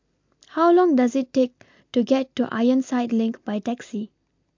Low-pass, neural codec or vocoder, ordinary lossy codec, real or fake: 7.2 kHz; none; MP3, 48 kbps; real